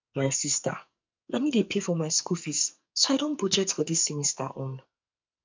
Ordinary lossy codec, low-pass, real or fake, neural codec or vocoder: MP3, 64 kbps; 7.2 kHz; fake; codec, 44.1 kHz, 2.6 kbps, SNAC